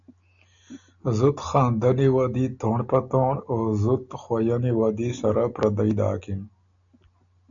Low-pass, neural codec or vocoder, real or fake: 7.2 kHz; none; real